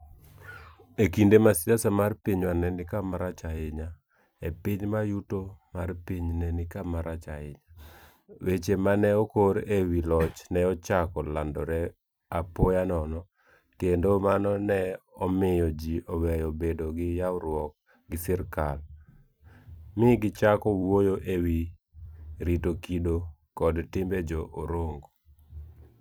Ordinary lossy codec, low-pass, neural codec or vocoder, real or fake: none; none; vocoder, 44.1 kHz, 128 mel bands every 256 samples, BigVGAN v2; fake